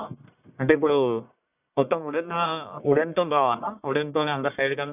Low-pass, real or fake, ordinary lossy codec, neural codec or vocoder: 3.6 kHz; fake; none; codec, 44.1 kHz, 1.7 kbps, Pupu-Codec